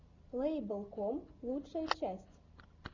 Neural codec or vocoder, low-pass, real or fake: none; 7.2 kHz; real